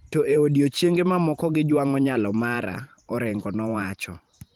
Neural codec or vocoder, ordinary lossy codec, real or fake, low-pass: vocoder, 44.1 kHz, 128 mel bands every 512 samples, BigVGAN v2; Opus, 24 kbps; fake; 19.8 kHz